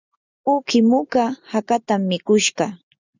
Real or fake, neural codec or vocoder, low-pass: real; none; 7.2 kHz